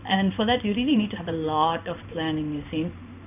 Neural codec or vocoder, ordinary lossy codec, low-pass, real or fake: codec, 16 kHz in and 24 kHz out, 1 kbps, XY-Tokenizer; none; 3.6 kHz; fake